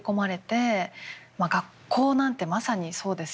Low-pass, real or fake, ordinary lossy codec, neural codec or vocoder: none; real; none; none